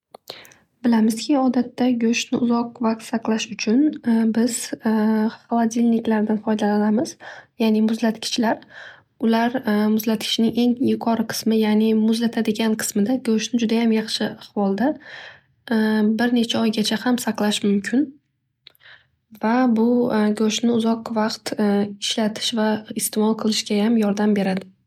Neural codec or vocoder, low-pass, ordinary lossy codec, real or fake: none; 19.8 kHz; MP3, 96 kbps; real